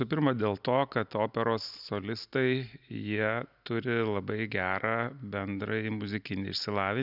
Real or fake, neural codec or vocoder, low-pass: real; none; 5.4 kHz